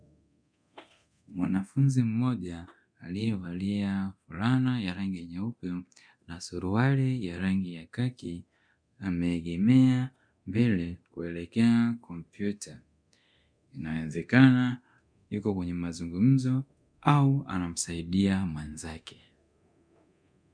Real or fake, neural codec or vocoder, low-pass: fake; codec, 24 kHz, 0.9 kbps, DualCodec; 9.9 kHz